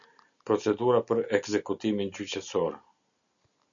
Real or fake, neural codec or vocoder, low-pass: real; none; 7.2 kHz